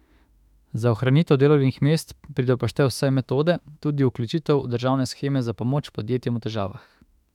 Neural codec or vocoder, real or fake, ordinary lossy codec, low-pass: autoencoder, 48 kHz, 32 numbers a frame, DAC-VAE, trained on Japanese speech; fake; none; 19.8 kHz